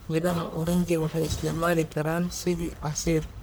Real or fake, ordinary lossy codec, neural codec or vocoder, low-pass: fake; none; codec, 44.1 kHz, 1.7 kbps, Pupu-Codec; none